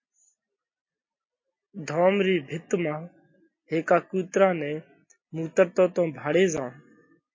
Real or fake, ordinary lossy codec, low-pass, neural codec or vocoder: real; MP3, 32 kbps; 7.2 kHz; none